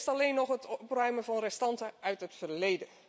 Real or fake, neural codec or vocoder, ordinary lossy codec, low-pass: real; none; none; none